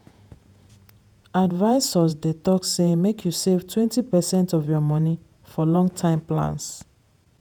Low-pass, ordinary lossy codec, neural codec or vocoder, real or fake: 19.8 kHz; none; vocoder, 48 kHz, 128 mel bands, Vocos; fake